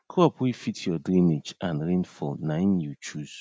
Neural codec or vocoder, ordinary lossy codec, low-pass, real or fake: none; none; none; real